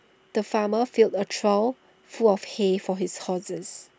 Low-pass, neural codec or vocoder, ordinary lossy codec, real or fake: none; none; none; real